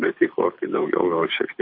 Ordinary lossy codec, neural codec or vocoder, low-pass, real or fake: MP3, 32 kbps; codec, 24 kHz, 6 kbps, HILCodec; 5.4 kHz; fake